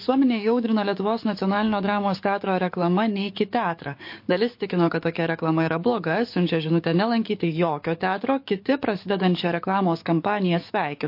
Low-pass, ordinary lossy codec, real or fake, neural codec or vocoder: 5.4 kHz; MP3, 32 kbps; fake; codec, 44.1 kHz, 7.8 kbps, DAC